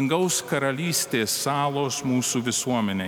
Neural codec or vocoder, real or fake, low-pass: none; real; 19.8 kHz